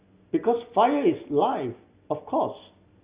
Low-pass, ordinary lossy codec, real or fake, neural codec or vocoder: 3.6 kHz; Opus, 64 kbps; real; none